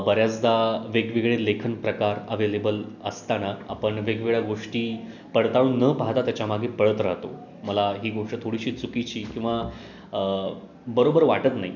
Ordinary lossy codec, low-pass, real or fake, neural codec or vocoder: none; 7.2 kHz; real; none